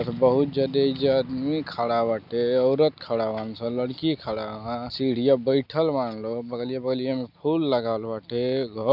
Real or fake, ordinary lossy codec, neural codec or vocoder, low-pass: real; none; none; 5.4 kHz